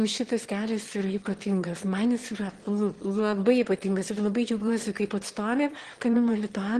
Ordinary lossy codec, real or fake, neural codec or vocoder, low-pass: Opus, 16 kbps; fake; autoencoder, 22.05 kHz, a latent of 192 numbers a frame, VITS, trained on one speaker; 9.9 kHz